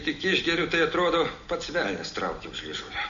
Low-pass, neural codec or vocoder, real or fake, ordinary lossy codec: 7.2 kHz; none; real; Opus, 64 kbps